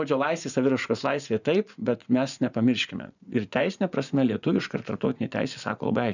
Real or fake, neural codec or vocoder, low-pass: fake; vocoder, 24 kHz, 100 mel bands, Vocos; 7.2 kHz